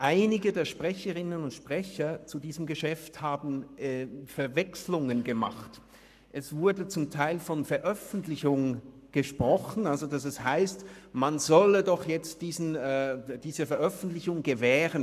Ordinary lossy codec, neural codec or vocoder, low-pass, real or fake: none; codec, 44.1 kHz, 7.8 kbps, Pupu-Codec; 14.4 kHz; fake